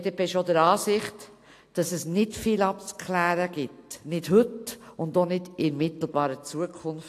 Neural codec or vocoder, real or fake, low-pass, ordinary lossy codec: none; real; 14.4 kHz; AAC, 64 kbps